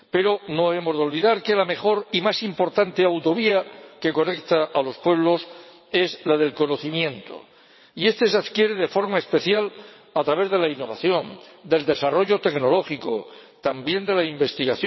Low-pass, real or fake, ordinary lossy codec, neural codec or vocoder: 7.2 kHz; fake; MP3, 24 kbps; vocoder, 22.05 kHz, 80 mel bands, WaveNeXt